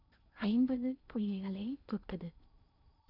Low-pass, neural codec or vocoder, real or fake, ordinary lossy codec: 5.4 kHz; codec, 16 kHz in and 24 kHz out, 0.8 kbps, FocalCodec, streaming, 65536 codes; fake; none